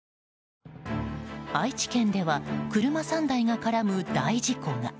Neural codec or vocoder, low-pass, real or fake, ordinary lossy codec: none; none; real; none